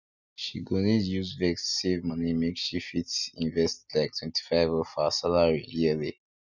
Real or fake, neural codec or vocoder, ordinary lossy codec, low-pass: real; none; none; 7.2 kHz